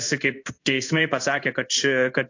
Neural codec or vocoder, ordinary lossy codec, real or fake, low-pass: codec, 16 kHz in and 24 kHz out, 1 kbps, XY-Tokenizer; AAC, 48 kbps; fake; 7.2 kHz